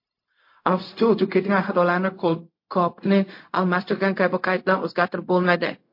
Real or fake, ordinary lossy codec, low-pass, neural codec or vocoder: fake; MP3, 32 kbps; 5.4 kHz; codec, 16 kHz, 0.4 kbps, LongCat-Audio-Codec